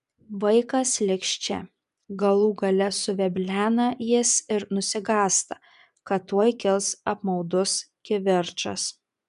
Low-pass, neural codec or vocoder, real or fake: 10.8 kHz; vocoder, 24 kHz, 100 mel bands, Vocos; fake